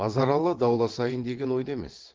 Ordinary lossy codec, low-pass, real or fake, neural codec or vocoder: Opus, 16 kbps; 7.2 kHz; fake; vocoder, 22.05 kHz, 80 mel bands, WaveNeXt